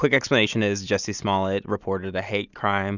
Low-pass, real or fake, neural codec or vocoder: 7.2 kHz; real; none